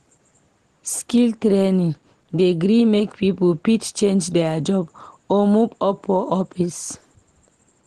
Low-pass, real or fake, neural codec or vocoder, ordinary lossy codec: 10.8 kHz; real; none; Opus, 16 kbps